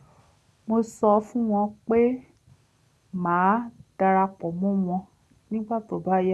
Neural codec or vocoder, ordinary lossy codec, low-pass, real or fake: none; none; none; real